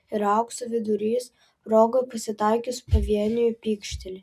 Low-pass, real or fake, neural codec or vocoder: 14.4 kHz; real; none